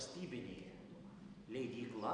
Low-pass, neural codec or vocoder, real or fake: 9.9 kHz; none; real